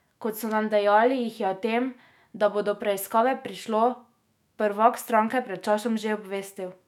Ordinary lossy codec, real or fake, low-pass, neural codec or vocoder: none; fake; 19.8 kHz; autoencoder, 48 kHz, 128 numbers a frame, DAC-VAE, trained on Japanese speech